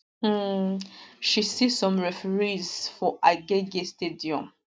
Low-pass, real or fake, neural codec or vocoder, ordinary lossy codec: none; real; none; none